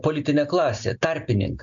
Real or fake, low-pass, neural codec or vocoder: real; 7.2 kHz; none